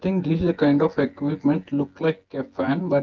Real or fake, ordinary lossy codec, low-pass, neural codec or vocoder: fake; Opus, 16 kbps; 7.2 kHz; vocoder, 24 kHz, 100 mel bands, Vocos